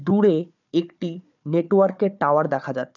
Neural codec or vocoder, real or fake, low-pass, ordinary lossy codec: codec, 16 kHz, 4 kbps, FunCodec, trained on Chinese and English, 50 frames a second; fake; 7.2 kHz; none